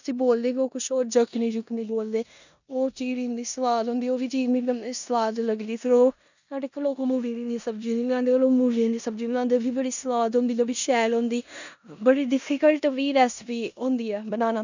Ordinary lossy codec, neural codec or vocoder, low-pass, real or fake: none; codec, 16 kHz in and 24 kHz out, 0.9 kbps, LongCat-Audio-Codec, four codebook decoder; 7.2 kHz; fake